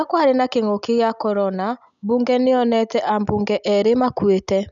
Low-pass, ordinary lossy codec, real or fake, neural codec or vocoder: 7.2 kHz; none; real; none